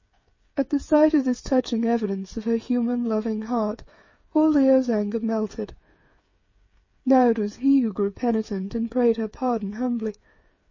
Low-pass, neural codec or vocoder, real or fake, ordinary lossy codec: 7.2 kHz; codec, 16 kHz, 8 kbps, FreqCodec, smaller model; fake; MP3, 32 kbps